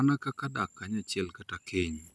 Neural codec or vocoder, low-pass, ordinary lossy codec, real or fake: none; none; none; real